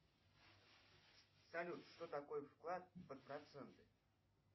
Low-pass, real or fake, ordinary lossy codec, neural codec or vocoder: 7.2 kHz; real; MP3, 24 kbps; none